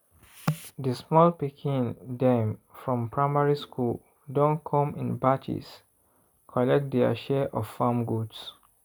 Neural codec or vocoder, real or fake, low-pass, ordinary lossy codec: none; real; none; none